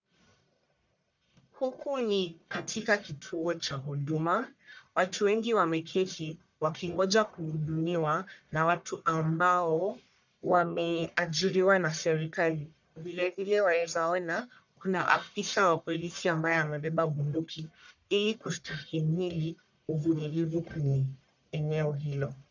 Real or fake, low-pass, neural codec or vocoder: fake; 7.2 kHz; codec, 44.1 kHz, 1.7 kbps, Pupu-Codec